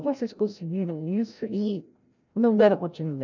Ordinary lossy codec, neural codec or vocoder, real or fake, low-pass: none; codec, 16 kHz, 0.5 kbps, FreqCodec, larger model; fake; 7.2 kHz